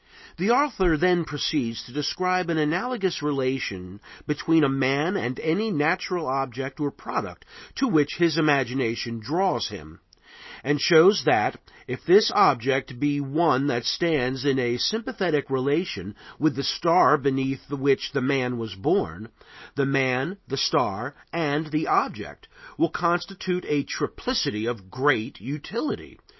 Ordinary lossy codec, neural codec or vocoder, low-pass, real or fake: MP3, 24 kbps; none; 7.2 kHz; real